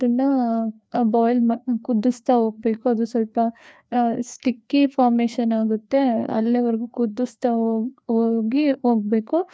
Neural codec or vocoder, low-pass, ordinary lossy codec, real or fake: codec, 16 kHz, 2 kbps, FreqCodec, larger model; none; none; fake